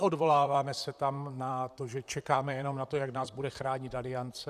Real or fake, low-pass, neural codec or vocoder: fake; 14.4 kHz; vocoder, 44.1 kHz, 128 mel bands, Pupu-Vocoder